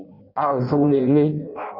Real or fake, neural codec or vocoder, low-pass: fake; codec, 16 kHz in and 24 kHz out, 0.6 kbps, FireRedTTS-2 codec; 5.4 kHz